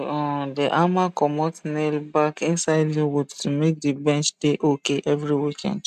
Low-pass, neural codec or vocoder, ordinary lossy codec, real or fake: 14.4 kHz; none; none; real